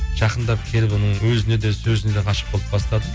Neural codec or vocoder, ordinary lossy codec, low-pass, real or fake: none; none; none; real